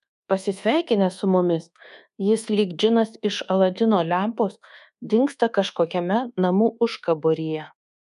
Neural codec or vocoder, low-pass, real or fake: codec, 24 kHz, 1.2 kbps, DualCodec; 10.8 kHz; fake